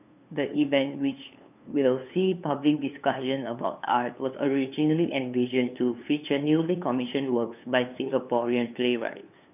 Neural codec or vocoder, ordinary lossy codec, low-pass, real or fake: codec, 16 kHz, 2 kbps, FunCodec, trained on LibriTTS, 25 frames a second; none; 3.6 kHz; fake